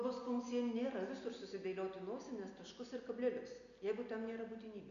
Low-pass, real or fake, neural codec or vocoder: 7.2 kHz; real; none